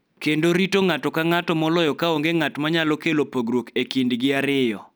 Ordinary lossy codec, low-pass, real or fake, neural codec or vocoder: none; none; real; none